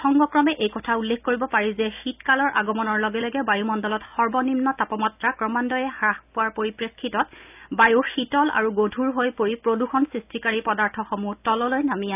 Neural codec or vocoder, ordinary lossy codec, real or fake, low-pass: none; none; real; 3.6 kHz